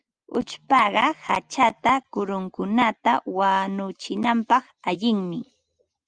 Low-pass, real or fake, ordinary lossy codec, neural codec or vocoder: 9.9 kHz; real; Opus, 32 kbps; none